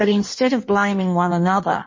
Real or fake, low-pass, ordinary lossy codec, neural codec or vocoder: fake; 7.2 kHz; MP3, 32 kbps; codec, 16 kHz in and 24 kHz out, 1.1 kbps, FireRedTTS-2 codec